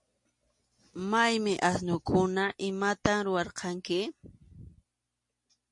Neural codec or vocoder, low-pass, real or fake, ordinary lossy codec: none; 10.8 kHz; real; MP3, 48 kbps